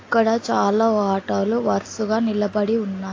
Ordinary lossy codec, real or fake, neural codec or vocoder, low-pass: AAC, 32 kbps; real; none; 7.2 kHz